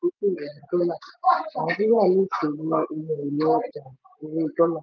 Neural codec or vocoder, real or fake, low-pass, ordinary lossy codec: vocoder, 24 kHz, 100 mel bands, Vocos; fake; 7.2 kHz; none